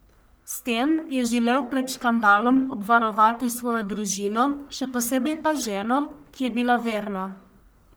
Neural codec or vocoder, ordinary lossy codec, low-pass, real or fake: codec, 44.1 kHz, 1.7 kbps, Pupu-Codec; none; none; fake